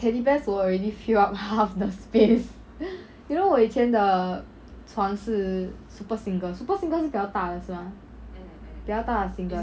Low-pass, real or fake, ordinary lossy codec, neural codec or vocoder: none; real; none; none